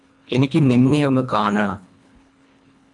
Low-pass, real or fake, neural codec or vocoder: 10.8 kHz; fake; codec, 24 kHz, 1.5 kbps, HILCodec